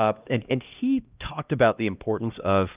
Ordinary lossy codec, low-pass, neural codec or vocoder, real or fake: Opus, 24 kbps; 3.6 kHz; codec, 16 kHz, 1 kbps, X-Codec, HuBERT features, trained on LibriSpeech; fake